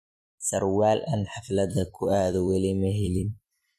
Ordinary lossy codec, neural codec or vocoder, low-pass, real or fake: MP3, 64 kbps; vocoder, 44.1 kHz, 128 mel bands every 256 samples, BigVGAN v2; 14.4 kHz; fake